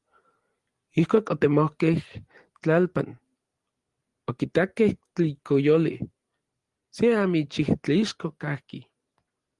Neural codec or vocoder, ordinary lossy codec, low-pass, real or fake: none; Opus, 24 kbps; 10.8 kHz; real